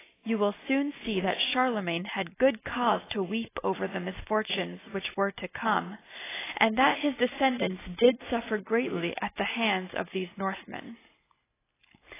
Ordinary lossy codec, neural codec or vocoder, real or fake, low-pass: AAC, 16 kbps; none; real; 3.6 kHz